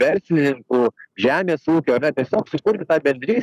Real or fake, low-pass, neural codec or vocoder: fake; 14.4 kHz; codec, 44.1 kHz, 7.8 kbps, Pupu-Codec